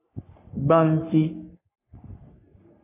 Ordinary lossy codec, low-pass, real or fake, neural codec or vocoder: AAC, 24 kbps; 3.6 kHz; fake; codec, 44.1 kHz, 3.4 kbps, Pupu-Codec